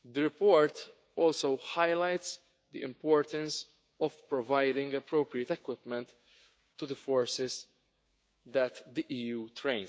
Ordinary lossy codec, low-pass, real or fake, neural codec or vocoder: none; none; fake; codec, 16 kHz, 6 kbps, DAC